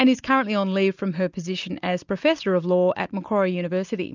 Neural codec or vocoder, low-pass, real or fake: none; 7.2 kHz; real